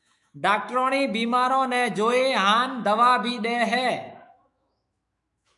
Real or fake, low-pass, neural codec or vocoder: fake; 10.8 kHz; autoencoder, 48 kHz, 128 numbers a frame, DAC-VAE, trained on Japanese speech